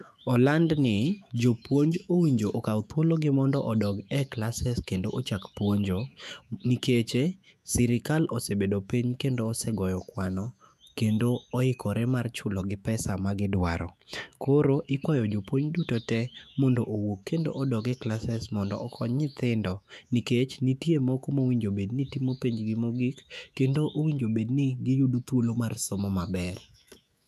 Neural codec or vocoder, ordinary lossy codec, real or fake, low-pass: autoencoder, 48 kHz, 128 numbers a frame, DAC-VAE, trained on Japanese speech; none; fake; 14.4 kHz